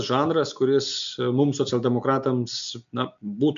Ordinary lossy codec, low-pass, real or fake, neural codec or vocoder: MP3, 64 kbps; 7.2 kHz; real; none